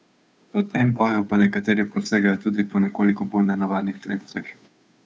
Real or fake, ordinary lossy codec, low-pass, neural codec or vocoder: fake; none; none; codec, 16 kHz, 2 kbps, FunCodec, trained on Chinese and English, 25 frames a second